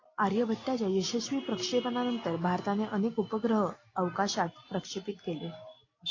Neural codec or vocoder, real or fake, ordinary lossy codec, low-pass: none; real; AAC, 32 kbps; 7.2 kHz